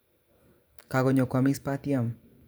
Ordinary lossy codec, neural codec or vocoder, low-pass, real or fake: none; vocoder, 44.1 kHz, 128 mel bands every 512 samples, BigVGAN v2; none; fake